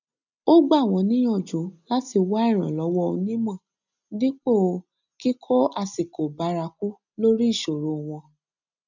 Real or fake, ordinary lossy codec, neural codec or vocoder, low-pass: real; none; none; 7.2 kHz